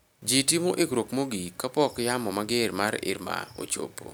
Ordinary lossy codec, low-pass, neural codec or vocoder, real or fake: none; none; none; real